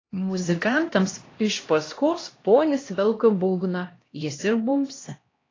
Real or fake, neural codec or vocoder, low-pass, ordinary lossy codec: fake; codec, 16 kHz, 1 kbps, X-Codec, HuBERT features, trained on LibriSpeech; 7.2 kHz; AAC, 32 kbps